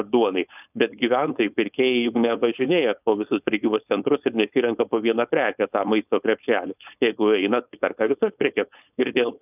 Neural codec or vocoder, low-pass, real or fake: codec, 16 kHz, 4.8 kbps, FACodec; 3.6 kHz; fake